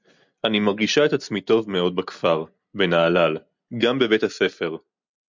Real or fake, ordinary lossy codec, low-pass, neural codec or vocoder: real; MP3, 64 kbps; 7.2 kHz; none